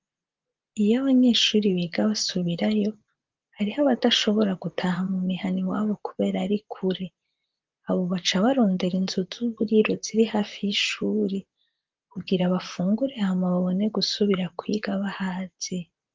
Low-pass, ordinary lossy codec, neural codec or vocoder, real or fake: 7.2 kHz; Opus, 32 kbps; vocoder, 44.1 kHz, 128 mel bands, Pupu-Vocoder; fake